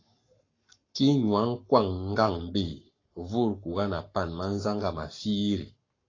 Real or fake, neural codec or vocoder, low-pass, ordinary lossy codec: fake; codec, 44.1 kHz, 7.8 kbps, Pupu-Codec; 7.2 kHz; AAC, 32 kbps